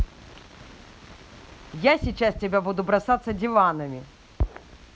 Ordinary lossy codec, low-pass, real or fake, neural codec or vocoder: none; none; real; none